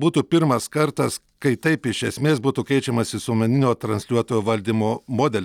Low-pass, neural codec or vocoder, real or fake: 19.8 kHz; none; real